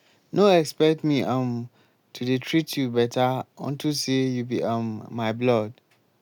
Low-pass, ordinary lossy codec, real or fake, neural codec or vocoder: 19.8 kHz; none; real; none